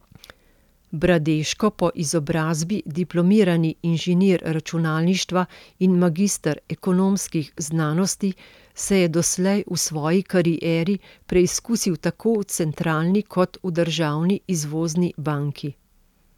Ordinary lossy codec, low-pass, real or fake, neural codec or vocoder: none; 19.8 kHz; real; none